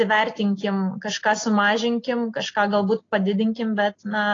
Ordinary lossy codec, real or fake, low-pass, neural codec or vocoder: AAC, 32 kbps; real; 7.2 kHz; none